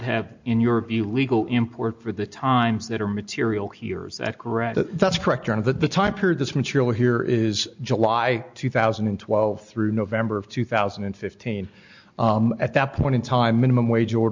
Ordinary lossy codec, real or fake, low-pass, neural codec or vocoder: MP3, 64 kbps; real; 7.2 kHz; none